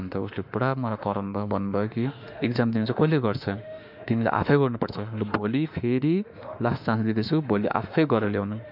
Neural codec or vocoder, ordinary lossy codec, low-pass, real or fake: autoencoder, 48 kHz, 32 numbers a frame, DAC-VAE, trained on Japanese speech; none; 5.4 kHz; fake